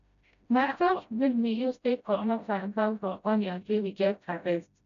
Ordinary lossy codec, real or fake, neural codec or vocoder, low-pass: none; fake; codec, 16 kHz, 0.5 kbps, FreqCodec, smaller model; 7.2 kHz